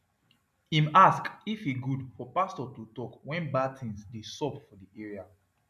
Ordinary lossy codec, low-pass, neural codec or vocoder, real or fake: none; 14.4 kHz; none; real